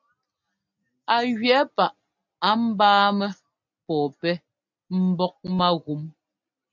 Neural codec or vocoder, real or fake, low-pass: none; real; 7.2 kHz